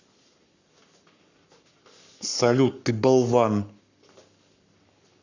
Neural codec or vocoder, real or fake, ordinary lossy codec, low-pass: codec, 44.1 kHz, 7.8 kbps, Pupu-Codec; fake; none; 7.2 kHz